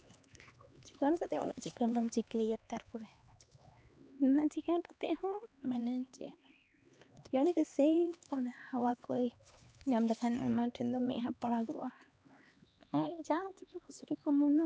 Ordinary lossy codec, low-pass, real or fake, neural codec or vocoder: none; none; fake; codec, 16 kHz, 2 kbps, X-Codec, HuBERT features, trained on LibriSpeech